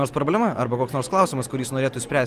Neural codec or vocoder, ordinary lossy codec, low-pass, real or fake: none; Opus, 32 kbps; 14.4 kHz; real